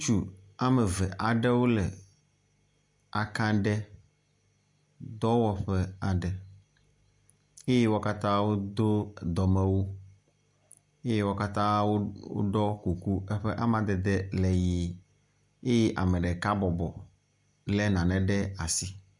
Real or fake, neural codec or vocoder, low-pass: real; none; 10.8 kHz